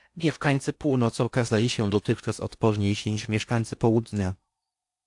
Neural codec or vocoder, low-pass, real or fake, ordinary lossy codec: codec, 16 kHz in and 24 kHz out, 0.6 kbps, FocalCodec, streaming, 4096 codes; 10.8 kHz; fake; AAC, 64 kbps